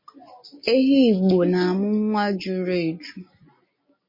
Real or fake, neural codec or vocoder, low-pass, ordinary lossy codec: real; none; 5.4 kHz; MP3, 24 kbps